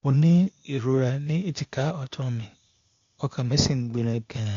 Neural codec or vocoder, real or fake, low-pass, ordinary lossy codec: codec, 16 kHz, 0.8 kbps, ZipCodec; fake; 7.2 kHz; MP3, 48 kbps